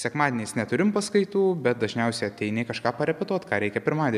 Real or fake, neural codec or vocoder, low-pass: real; none; 14.4 kHz